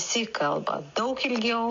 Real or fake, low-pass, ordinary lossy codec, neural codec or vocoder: real; 7.2 kHz; AAC, 48 kbps; none